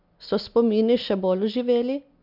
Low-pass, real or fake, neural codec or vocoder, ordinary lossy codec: 5.4 kHz; real; none; MP3, 48 kbps